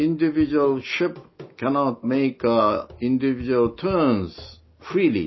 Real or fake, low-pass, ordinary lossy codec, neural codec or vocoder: real; 7.2 kHz; MP3, 24 kbps; none